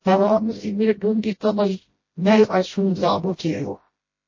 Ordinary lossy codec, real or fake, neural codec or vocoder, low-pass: MP3, 32 kbps; fake; codec, 16 kHz, 0.5 kbps, FreqCodec, smaller model; 7.2 kHz